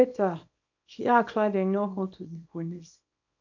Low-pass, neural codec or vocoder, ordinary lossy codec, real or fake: 7.2 kHz; codec, 24 kHz, 0.9 kbps, WavTokenizer, small release; none; fake